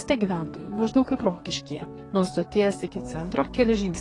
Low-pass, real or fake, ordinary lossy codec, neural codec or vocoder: 10.8 kHz; fake; AAC, 32 kbps; codec, 32 kHz, 1.9 kbps, SNAC